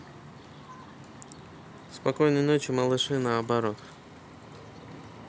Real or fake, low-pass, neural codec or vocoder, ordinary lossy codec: real; none; none; none